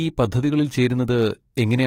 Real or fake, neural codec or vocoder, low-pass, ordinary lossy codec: fake; codec, 44.1 kHz, 7.8 kbps, DAC; 19.8 kHz; AAC, 48 kbps